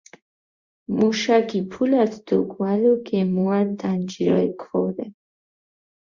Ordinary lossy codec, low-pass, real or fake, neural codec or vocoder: Opus, 64 kbps; 7.2 kHz; fake; codec, 16 kHz in and 24 kHz out, 1 kbps, XY-Tokenizer